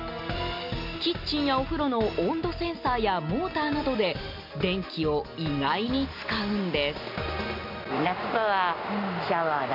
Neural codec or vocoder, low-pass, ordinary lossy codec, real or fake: none; 5.4 kHz; MP3, 48 kbps; real